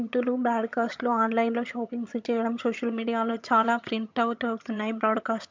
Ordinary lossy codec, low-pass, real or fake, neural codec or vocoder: MP3, 64 kbps; 7.2 kHz; fake; vocoder, 22.05 kHz, 80 mel bands, HiFi-GAN